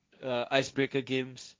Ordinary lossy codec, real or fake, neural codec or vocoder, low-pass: none; fake; codec, 16 kHz, 1.1 kbps, Voila-Tokenizer; none